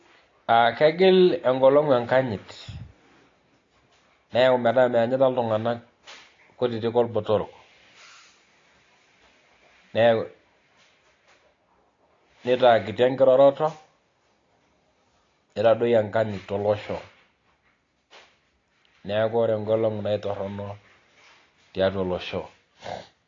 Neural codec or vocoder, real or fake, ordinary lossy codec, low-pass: none; real; AAC, 32 kbps; 7.2 kHz